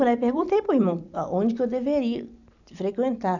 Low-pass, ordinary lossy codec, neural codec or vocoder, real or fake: 7.2 kHz; none; vocoder, 44.1 kHz, 128 mel bands every 256 samples, BigVGAN v2; fake